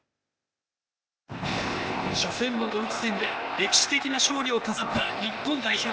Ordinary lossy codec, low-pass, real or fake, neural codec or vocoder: none; none; fake; codec, 16 kHz, 0.8 kbps, ZipCodec